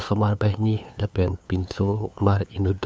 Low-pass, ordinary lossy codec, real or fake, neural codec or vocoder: none; none; fake; codec, 16 kHz, 2 kbps, FunCodec, trained on LibriTTS, 25 frames a second